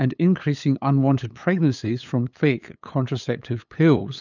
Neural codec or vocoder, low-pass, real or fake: codec, 16 kHz, 8 kbps, FunCodec, trained on LibriTTS, 25 frames a second; 7.2 kHz; fake